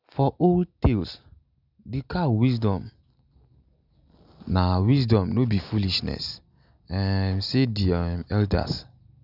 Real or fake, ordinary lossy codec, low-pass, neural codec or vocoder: real; none; 5.4 kHz; none